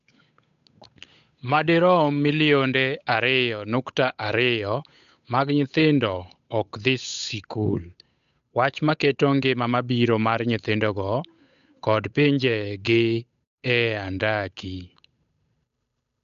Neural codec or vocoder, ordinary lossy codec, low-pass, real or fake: codec, 16 kHz, 8 kbps, FunCodec, trained on Chinese and English, 25 frames a second; none; 7.2 kHz; fake